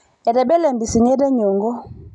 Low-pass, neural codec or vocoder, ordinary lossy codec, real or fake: 10.8 kHz; none; none; real